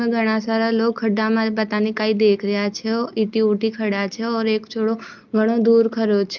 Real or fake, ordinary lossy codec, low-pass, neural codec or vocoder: real; Opus, 32 kbps; 7.2 kHz; none